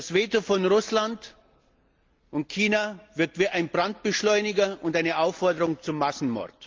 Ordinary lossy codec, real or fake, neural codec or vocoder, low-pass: Opus, 24 kbps; real; none; 7.2 kHz